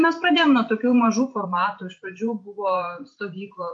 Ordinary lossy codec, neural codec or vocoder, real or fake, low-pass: MP3, 48 kbps; vocoder, 44.1 kHz, 128 mel bands every 512 samples, BigVGAN v2; fake; 10.8 kHz